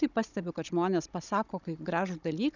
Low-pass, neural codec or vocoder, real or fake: 7.2 kHz; codec, 16 kHz, 16 kbps, FunCodec, trained on Chinese and English, 50 frames a second; fake